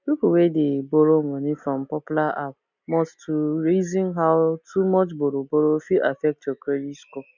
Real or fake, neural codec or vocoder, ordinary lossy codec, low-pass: real; none; none; 7.2 kHz